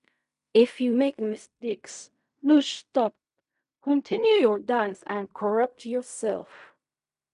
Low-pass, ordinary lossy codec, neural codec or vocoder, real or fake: 10.8 kHz; none; codec, 16 kHz in and 24 kHz out, 0.4 kbps, LongCat-Audio-Codec, fine tuned four codebook decoder; fake